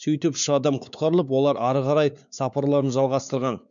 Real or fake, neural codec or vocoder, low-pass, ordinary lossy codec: fake; codec, 16 kHz, 4 kbps, X-Codec, WavLM features, trained on Multilingual LibriSpeech; 7.2 kHz; none